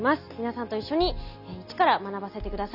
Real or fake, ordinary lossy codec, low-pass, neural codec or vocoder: real; none; 5.4 kHz; none